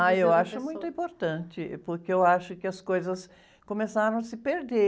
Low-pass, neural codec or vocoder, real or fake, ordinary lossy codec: none; none; real; none